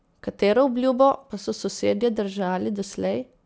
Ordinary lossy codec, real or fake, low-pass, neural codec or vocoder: none; real; none; none